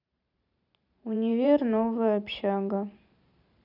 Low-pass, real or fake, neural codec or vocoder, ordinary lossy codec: 5.4 kHz; fake; vocoder, 44.1 kHz, 128 mel bands every 512 samples, BigVGAN v2; none